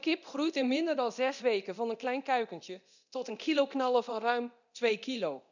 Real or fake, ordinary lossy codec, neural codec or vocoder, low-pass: fake; none; codec, 24 kHz, 0.9 kbps, DualCodec; 7.2 kHz